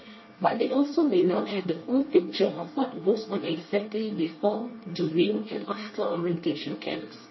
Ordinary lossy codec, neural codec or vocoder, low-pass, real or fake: MP3, 24 kbps; codec, 24 kHz, 1 kbps, SNAC; 7.2 kHz; fake